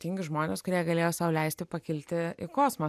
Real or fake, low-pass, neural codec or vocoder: real; 14.4 kHz; none